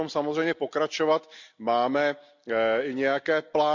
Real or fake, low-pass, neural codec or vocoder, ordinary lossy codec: real; 7.2 kHz; none; MP3, 64 kbps